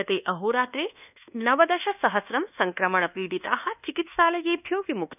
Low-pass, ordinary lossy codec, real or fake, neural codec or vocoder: 3.6 kHz; none; fake; codec, 24 kHz, 1.2 kbps, DualCodec